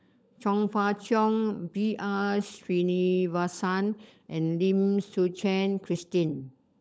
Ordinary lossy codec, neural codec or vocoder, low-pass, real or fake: none; codec, 16 kHz, 16 kbps, FunCodec, trained on LibriTTS, 50 frames a second; none; fake